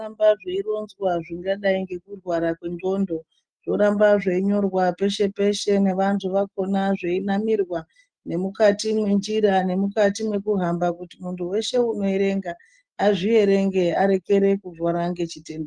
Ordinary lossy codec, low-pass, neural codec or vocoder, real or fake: Opus, 24 kbps; 9.9 kHz; none; real